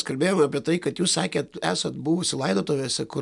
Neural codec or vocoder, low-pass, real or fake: none; 10.8 kHz; real